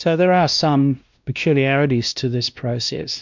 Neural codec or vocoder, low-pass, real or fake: codec, 16 kHz, 1 kbps, X-Codec, WavLM features, trained on Multilingual LibriSpeech; 7.2 kHz; fake